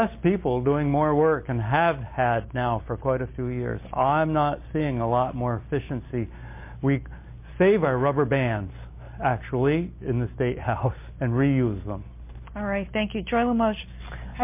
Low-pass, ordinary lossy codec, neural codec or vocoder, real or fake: 3.6 kHz; MP3, 24 kbps; none; real